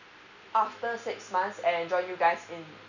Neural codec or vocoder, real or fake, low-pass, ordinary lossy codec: none; real; 7.2 kHz; none